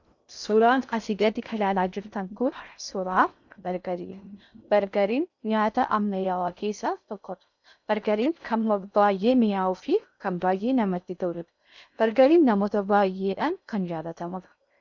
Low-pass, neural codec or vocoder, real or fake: 7.2 kHz; codec, 16 kHz in and 24 kHz out, 0.6 kbps, FocalCodec, streaming, 2048 codes; fake